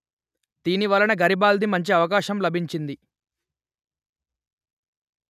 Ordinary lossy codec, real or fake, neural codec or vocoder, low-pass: none; real; none; 14.4 kHz